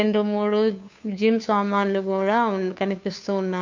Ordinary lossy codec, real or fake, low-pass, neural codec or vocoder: MP3, 64 kbps; fake; 7.2 kHz; codec, 16 kHz, 2 kbps, FunCodec, trained on Chinese and English, 25 frames a second